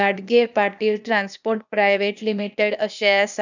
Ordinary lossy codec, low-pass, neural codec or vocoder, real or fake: none; 7.2 kHz; codec, 16 kHz, 0.8 kbps, ZipCodec; fake